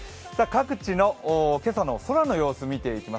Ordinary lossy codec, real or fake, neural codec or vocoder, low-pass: none; real; none; none